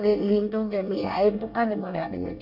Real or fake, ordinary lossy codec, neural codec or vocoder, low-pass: fake; MP3, 48 kbps; codec, 24 kHz, 1 kbps, SNAC; 5.4 kHz